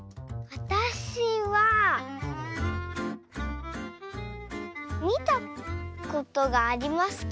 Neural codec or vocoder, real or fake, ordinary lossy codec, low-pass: none; real; none; none